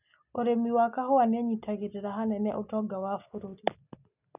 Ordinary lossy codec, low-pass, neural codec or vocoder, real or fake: none; 3.6 kHz; none; real